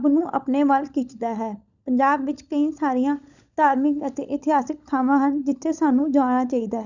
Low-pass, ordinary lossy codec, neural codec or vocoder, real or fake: 7.2 kHz; none; codec, 16 kHz, 16 kbps, FunCodec, trained on LibriTTS, 50 frames a second; fake